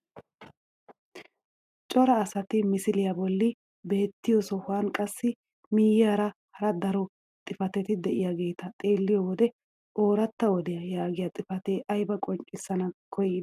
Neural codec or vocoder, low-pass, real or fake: none; 14.4 kHz; real